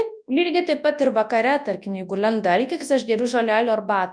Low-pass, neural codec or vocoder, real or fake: 9.9 kHz; codec, 24 kHz, 0.9 kbps, WavTokenizer, large speech release; fake